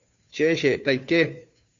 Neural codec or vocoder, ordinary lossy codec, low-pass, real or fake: codec, 16 kHz, 2 kbps, FunCodec, trained on Chinese and English, 25 frames a second; Opus, 64 kbps; 7.2 kHz; fake